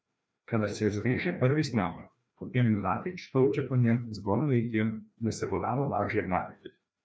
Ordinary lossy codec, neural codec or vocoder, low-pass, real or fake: none; codec, 16 kHz, 1 kbps, FreqCodec, larger model; none; fake